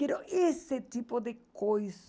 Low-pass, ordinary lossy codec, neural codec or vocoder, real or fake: none; none; none; real